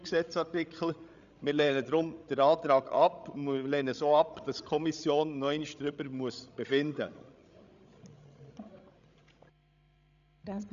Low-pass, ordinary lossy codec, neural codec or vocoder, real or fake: 7.2 kHz; none; codec, 16 kHz, 16 kbps, FreqCodec, larger model; fake